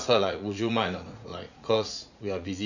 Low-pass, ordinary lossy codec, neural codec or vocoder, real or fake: 7.2 kHz; none; vocoder, 44.1 kHz, 80 mel bands, Vocos; fake